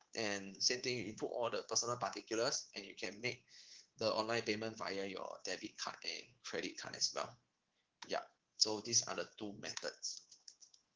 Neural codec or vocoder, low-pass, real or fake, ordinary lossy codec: codec, 24 kHz, 3.1 kbps, DualCodec; 7.2 kHz; fake; Opus, 16 kbps